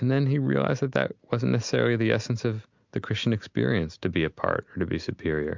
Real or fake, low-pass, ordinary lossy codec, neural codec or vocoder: real; 7.2 kHz; MP3, 64 kbps; none